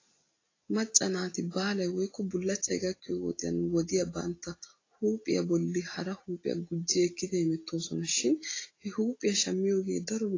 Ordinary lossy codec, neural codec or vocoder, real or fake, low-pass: AAC, 32 kbps; none; real; 7.2 kHz